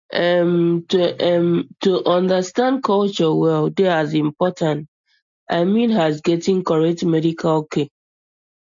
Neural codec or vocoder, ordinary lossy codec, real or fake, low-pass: none; MP3, 48 kbps; real; 7.2 kHz